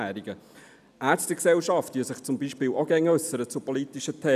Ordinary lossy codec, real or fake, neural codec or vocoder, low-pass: none; real; none; 14.4 kHz